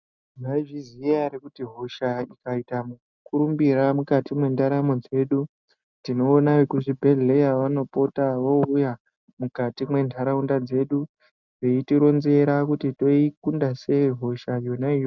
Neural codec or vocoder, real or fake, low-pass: none; real; 7.2 kHz